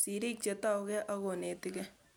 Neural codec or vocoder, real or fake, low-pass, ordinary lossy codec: none; real; none; none